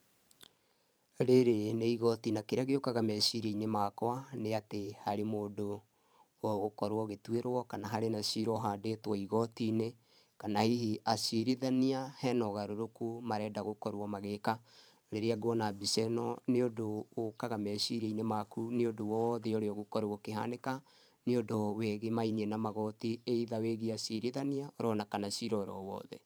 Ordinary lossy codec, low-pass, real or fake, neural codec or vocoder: none; none; fake; vocoder, 44.1 kHz, 128 mel bands every 512 samples, BigVGAN v2